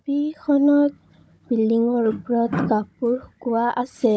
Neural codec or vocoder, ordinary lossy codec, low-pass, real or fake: codec, 16 kHz, 16 kbps, FunCodec, trained on Chinese and English, 50 frames a second; none; none; fake